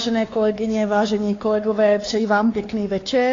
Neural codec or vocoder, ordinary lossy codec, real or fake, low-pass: codec, 16 kHz, 2 kbps, X-Codec, WavLM features, trained on Multilingual LibriSpeech; AAC, 32 kbps; fake; 7.2 kHz